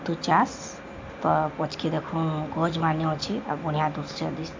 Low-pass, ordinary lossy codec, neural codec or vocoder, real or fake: 7.2 kHz; MP3, 48 kbps; none; real